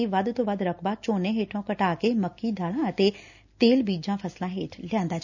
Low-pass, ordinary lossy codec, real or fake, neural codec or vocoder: 7.2 kHz; none; real; none